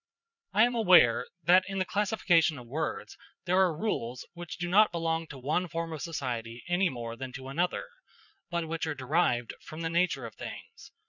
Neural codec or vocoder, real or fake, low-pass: vocoder, 22.05 kHz, 80 mel bands, Vocos; fake; 7.2 kHz